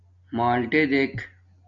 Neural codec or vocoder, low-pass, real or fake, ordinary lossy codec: none; 7.2 kHz; real; MP3, 48 kbps